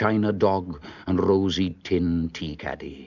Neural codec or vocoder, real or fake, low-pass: none; real; 7.2 kHz